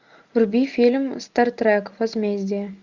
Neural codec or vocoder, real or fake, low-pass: none; real; 7.2 kHz